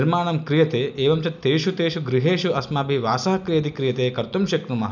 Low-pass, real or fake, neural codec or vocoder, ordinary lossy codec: 7.2 kHz; real; none; none